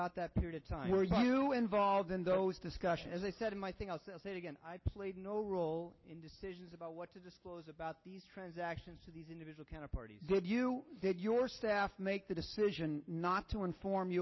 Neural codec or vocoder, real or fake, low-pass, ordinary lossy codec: none; real; 7.2 kHz; MP3, 24 kbps